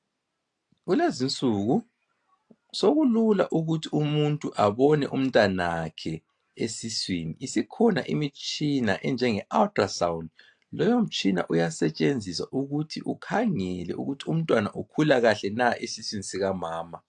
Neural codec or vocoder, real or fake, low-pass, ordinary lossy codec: none; real; 9.9 kHz; AAC, 64 kbps